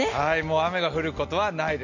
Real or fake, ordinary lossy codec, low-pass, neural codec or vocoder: real; none; 7.2 kHz; none